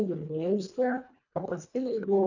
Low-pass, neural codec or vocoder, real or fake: 7.2 kHz; codec, 24 kHz, 1.5 kbps, HILCodec; fake